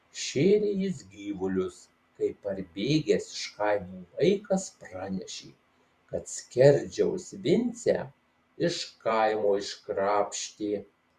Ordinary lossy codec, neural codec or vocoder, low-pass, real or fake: Opus, 64 kbps; vocoder, 44.1 kHz, 128 mel bands every 512 samples, BigVGAN v2; 14.4 kHz; fake